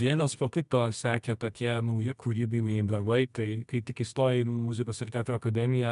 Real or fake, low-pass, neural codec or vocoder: fake; 10.8 kHz; codec, 24 kHz, 0.9 kbps, WavTokenizer, medium music audio release